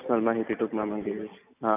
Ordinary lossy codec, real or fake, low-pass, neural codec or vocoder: none; real; 3.6 kHz; none